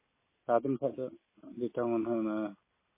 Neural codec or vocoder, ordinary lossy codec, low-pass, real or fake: none; MP3, 16 kbps; 3.6 kHz; real